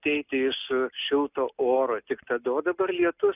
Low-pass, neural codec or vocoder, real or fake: 3.6 kHz; none; real